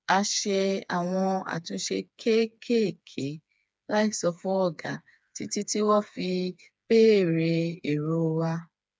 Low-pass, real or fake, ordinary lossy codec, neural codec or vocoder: none; fake; none; codec, 16 kHz, 4 kbps, FreqCodec, smaller model